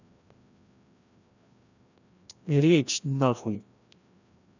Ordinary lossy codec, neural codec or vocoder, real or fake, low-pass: none; codec, 16 kHz, 1 kbps, FreqCodec, larger model; fake; 7.2 kHz